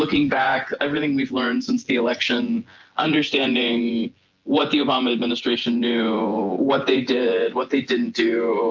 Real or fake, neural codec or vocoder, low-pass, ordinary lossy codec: fake; vocoder, 24 kHz, 100 mel bands, Vocos; 7.2 kHz; Opus, 24 kbps